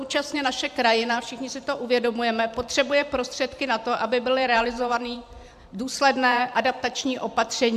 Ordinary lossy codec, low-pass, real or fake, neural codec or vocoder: AAC, 96 kbps; 14.4 kHz; fake; vocoder, 44.1 kHz, 128 mel bands every 512 samples, BigVGAN v2